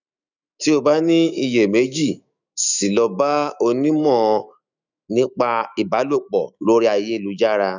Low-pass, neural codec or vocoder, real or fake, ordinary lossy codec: 7.2 kHz; autoencoder, 48 kHz, 128 numbers a frame, DAC-VAE, trained on Japanese speech; fake; none